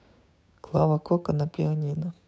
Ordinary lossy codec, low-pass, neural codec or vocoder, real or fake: none; none; codec, 16 kHz, 6 kbps, DAC; fake